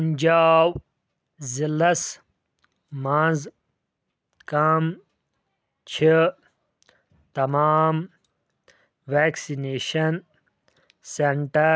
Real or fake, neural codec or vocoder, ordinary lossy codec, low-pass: real; none; none; none